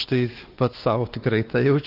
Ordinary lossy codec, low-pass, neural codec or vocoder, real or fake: Opus, 16 kbps; 5.4 kHz; codec, 16 kHz, 2 kbps, X-Codec, WavLM features, trained on Multilingual LibriSpeech; fake